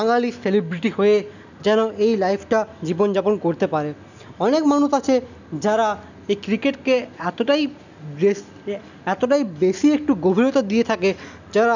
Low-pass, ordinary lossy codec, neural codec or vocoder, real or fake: 7.2 kHz; none; none; real